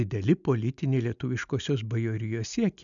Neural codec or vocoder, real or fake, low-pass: none; real; 7.2 kHz